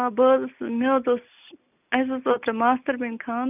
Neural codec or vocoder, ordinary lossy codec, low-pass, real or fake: none; none; 3.6 kHz; real